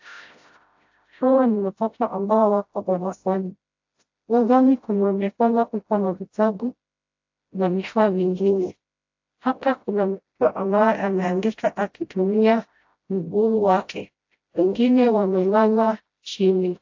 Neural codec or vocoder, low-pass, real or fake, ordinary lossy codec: codec, 16 kHz, 0.5 kbps, FreqCodec, smaller model; 7.2 kHz; fake; AAC, 48 kbps